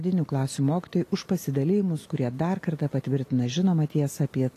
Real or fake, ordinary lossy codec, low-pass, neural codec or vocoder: real; AAC, 48 kbps; 14.4 kHz; none